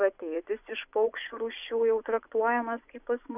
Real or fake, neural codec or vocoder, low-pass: fake; vocoder, 24 kHz, 100 mel bands, Vocos; 3.6 kHz